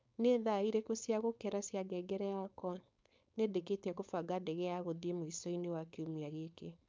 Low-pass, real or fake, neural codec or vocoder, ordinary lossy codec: none; fake; codec, 16 kHz, 4.8 kbps, FACodec; none